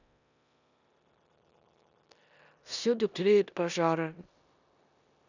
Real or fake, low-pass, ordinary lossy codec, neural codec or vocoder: fake; 7.2 kHz; none; codec, 16 kHz in and 24 kHz out, 0.9 kbps, LongCat-Audio-Codec, four codebook decoder